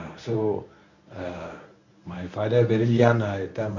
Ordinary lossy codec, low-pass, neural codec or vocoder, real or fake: none; 7.2 kHz; codec, 24 kHz, 0.9 kbps, WavTokenizer, medium speech release version 1; fake